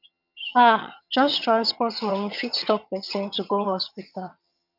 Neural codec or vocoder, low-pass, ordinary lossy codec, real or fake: vocoder, 22.05 kHz, 80 mel bands, HiFi-GAN; 5.4 kHz; none; fake